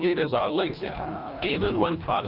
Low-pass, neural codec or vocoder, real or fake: 5.4 kHz; codec, 24 kHz, 1.5 kbps, HILCodec; fake